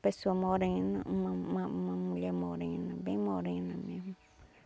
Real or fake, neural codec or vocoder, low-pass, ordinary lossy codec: real; none; none; none